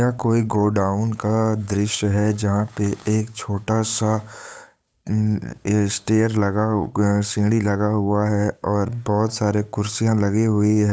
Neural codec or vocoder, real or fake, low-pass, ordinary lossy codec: codec, 16 kHz, 8 kbps, FunCodec, trained on Chinese and English, 25 frames a second; fake; none; none